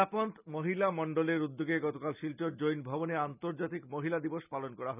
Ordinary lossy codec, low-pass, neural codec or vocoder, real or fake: none; 3.6 kHz; none; real